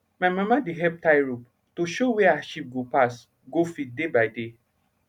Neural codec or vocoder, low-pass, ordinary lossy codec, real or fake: none; 19.8 kHz; none; real